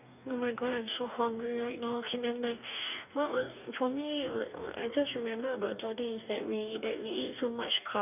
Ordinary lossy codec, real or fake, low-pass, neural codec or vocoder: none; fake; 3.6 kHz; codec, 44.1 kHz, 2.6 kbps, DAC